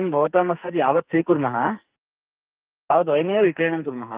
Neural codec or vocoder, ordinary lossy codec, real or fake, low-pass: codec, 44.1 kHz, 2.6 kbps, SNAC; Opus, 32 kbps; fake; 3.6 kHz